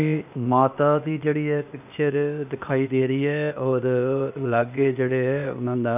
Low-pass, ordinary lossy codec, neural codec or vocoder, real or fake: 3.6 kHz; none; codec, 16 kHz, 0.8 kbps, ZipCodec; fake